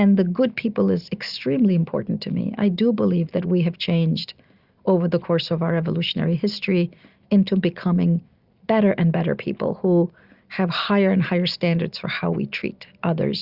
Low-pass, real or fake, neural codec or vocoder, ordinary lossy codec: 5.4 kHz; real; none; Opus, 64 kbps